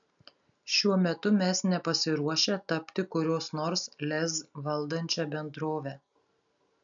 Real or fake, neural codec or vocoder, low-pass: real; none; 7.2 kHz